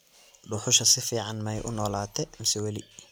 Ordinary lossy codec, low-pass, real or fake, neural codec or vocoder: none; none; real; none